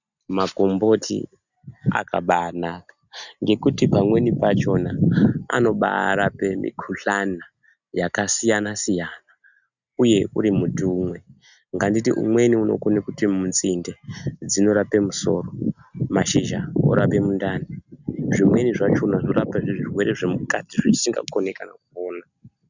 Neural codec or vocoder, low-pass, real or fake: none; 7.2 kHz; real